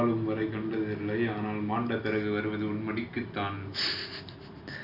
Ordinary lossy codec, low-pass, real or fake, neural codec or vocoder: none; 5.4 kHz; real; none